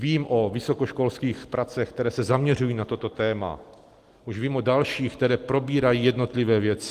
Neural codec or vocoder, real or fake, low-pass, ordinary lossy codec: none; real; 14.4 kHz; Opus, 24 kbps